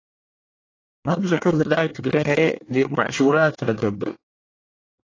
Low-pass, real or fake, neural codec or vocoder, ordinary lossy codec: 7.2 kHz; fake; codec, 24 kHz, 1 kbps, SNAC; AAC, 32 kbps